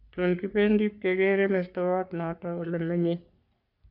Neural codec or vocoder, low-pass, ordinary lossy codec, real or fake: codec, 44.1 kHz, 3.4 kbps, Pupu-Codec; 5.4 kHz; none; fake